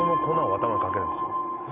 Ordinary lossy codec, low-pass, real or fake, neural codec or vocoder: none; 3.6 kHz; real; none